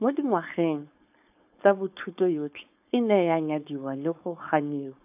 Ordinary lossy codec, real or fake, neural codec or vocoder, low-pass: AAC, 32 kbps; fake; codec, 16 kHz, 4.8 kbps, FACodec; 3.6 kHz